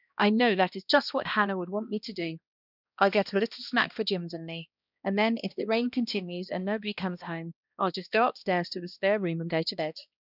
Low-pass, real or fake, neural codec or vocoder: 5.4 kHz; fake; codec, 16 kHz, 1 kbps, X-Codec, HuBERT features, trained on balanced general audio